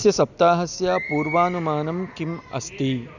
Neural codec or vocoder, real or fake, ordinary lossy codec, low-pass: none; real; none; 7.2 kHz